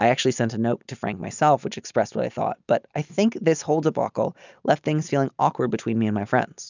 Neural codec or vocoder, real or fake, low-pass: none; real; 7.2 kHz